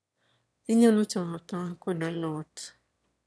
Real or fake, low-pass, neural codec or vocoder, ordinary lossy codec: fake; none; autoencoder, 22.05 kHz, a latent of 192 numbers a frame, VITS, trained on one speaker; none